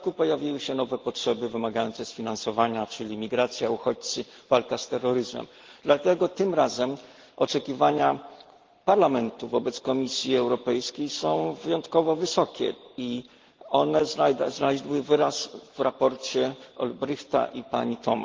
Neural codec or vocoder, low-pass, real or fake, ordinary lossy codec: none; 7.2 kHz; real; Opus, 16 kbps